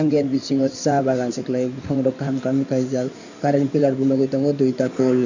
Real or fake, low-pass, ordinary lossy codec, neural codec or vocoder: fake; 7.2 kHz; none; codec, 16 kHz in and 24 kHz out, 2.2 kbps, FireRedTTS-2 codec